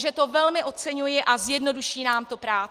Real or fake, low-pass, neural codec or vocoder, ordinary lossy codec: real; 14.4 kHz; none; Opus, 24 kbps